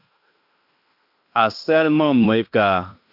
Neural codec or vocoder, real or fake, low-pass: codec, 16 kHz in and 24 kHz out, 0.9 kbps, LongCat-Audio-Codec, fine tuned four codebook decoder; fake; 5.4 kHz